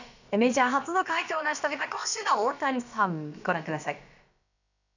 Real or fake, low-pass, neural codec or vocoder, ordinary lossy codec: fake; 7.2 kHz; codec, 16 kHz, about 1 kbps, DyCAST, with the encoder's durations; none